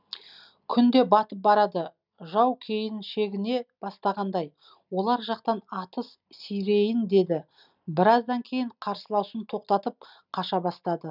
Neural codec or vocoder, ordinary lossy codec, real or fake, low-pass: none; none; real; 5.4 kHz